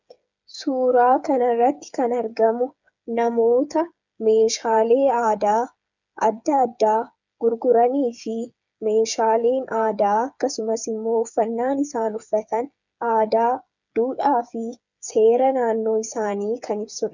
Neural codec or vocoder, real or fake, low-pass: codec, 16 kHz, 8 kbps, FreqCodec, smaller model; fake; 7.2 kHz